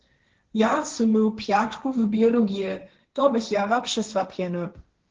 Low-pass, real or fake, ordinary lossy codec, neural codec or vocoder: 7.2 kHz; fake; Opus, 16 kbps; codec, 16 kHz, 1.1 kbps, Voila-Tokenizer